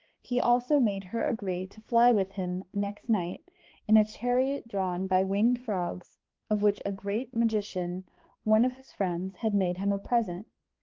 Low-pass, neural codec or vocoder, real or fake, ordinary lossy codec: 7.2 kHz; codec, 16 kHz, 2 kbps, X-Codec, HuBERT features, trained on balanced general audio; fake; Opus, 16 kbps